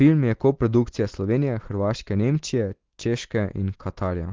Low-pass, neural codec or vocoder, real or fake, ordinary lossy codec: 7.2 kHz; none; real; Opus, 16 kbps